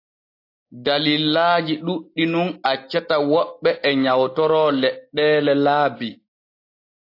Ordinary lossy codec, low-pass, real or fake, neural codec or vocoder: AAC, 32 kbps; 5.4 kHz; real; none